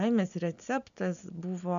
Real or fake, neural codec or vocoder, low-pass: real; none; 7.2 kHz